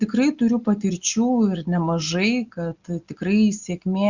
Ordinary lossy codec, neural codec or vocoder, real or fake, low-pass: Opus, 64 kbps; none; real; 7.2 kHz